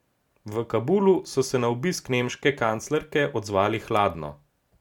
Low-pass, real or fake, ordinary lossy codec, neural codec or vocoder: 19.8 kHz; real; MP3, 96 kbps; none